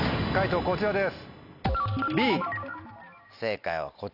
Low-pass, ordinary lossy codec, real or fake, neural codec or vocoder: 5.4 kHz; none; real; none